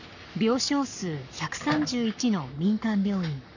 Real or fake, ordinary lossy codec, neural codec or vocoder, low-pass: fake; none; codec, 44.1 kHz, 7.8 kbps, Pupu-Codec; 7.2 kHz